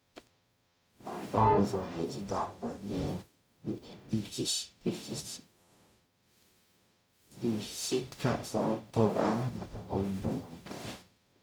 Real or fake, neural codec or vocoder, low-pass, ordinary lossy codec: fake; codec, 44.1 kHz, 0.9 kbps, DAC; none; none